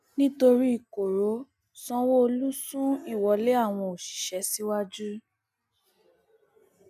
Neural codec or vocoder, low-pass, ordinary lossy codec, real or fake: none; 14.4 kHz; none; real